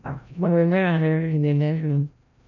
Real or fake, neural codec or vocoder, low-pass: fake; codec, 16 kHz, 0.5 kbps, FreqCodec, larger model; 7.2 kHz